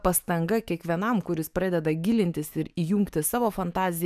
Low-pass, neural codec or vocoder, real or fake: 14.4 kHz; autoencoder, 48 kHz, 128 numbers a frame, DAC-VAE, trained on Japanese speech; fake